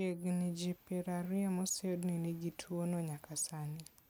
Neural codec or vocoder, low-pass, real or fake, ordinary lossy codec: none; none; real; none